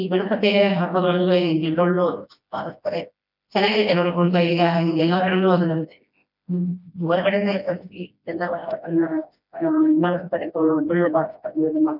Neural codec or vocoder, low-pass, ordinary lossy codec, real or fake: codec, 16 kHz, 1 kbps, FreqCodec, smaller model; 5.4 kHz; none; fake